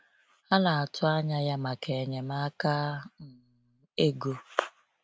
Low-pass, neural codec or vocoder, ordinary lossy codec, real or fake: none; none; none; real